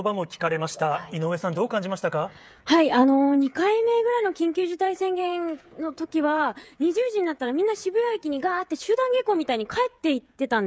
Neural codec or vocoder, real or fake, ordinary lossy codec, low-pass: codec, 16 kHz, 8 kbps, FreqCodec, smaller model; fake; none; none